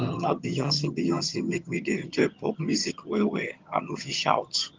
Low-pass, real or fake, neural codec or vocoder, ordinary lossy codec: 7.2 kHz; fake; vocoder, 22.05 kHz, 80 mel bands, HiFi-GAN; Opus, 32 kbps